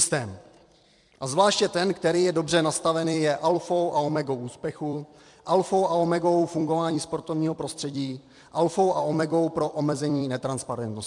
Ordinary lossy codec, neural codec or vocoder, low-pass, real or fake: MP3, 64 kbps; vocoder, 44.1 kHz, 128 mel bands every 256 samples, BigVGAN v2; 10.8 kHz; fake